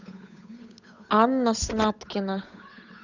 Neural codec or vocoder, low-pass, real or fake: codec, 16 kHz, 8 kbps, FunCodec, trained on Chinese and English, 25 frames a second; 7.2 kHz; fake